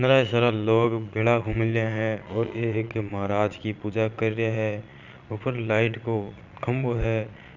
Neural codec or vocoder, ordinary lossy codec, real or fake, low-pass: vocoder, 22.05 kHz, 80 mel bands, Vocos; none; fake; 7.2 kHz